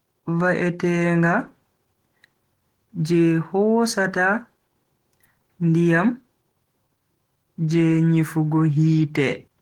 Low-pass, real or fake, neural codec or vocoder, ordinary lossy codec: 19.8 kHz; fake; autoencoder, 48 kHz, 128 numbers a frame, DAC-VAE, trained on Japanese speech; Opus, 16 kbps